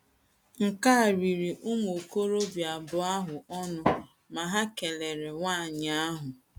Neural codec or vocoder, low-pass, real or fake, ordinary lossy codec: none; 19.8 kHz; real; none